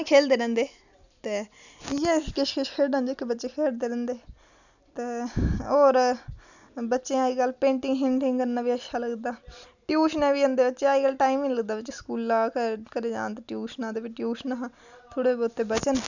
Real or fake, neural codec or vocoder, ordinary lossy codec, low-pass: real; none; none; 7.2 kHz